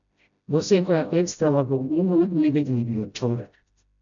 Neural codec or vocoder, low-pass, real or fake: codec, 16 kHz, 0.5 kbps, FreqCodec, smaller model; 7.2 kHz; fake